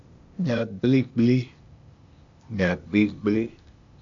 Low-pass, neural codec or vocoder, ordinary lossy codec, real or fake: 7.2 kHz; codec, 16 kHz, 0.8 kbps, ZipCodec; MP3, 64 kbps; fake